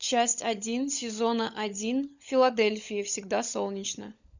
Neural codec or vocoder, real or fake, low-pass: codec, 16 kHz, 16 kbps, FunCodec, trained on LibriTTS, 50 frames a second; fake; 7.2 kHz